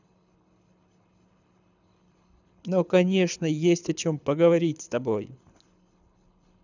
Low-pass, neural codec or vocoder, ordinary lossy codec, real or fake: 7.2 kHz; codec, 24 kHz, 6 kbps, HILCodec; none; fake